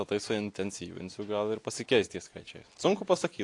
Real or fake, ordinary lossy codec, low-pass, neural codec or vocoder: real; AAC, 48 kbps; 10.8 kHz; none